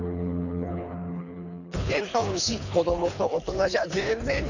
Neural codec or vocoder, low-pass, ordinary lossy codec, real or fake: codec, 24 kHz, 3 kbps, HILCodec; 7.2 kHz; none; fake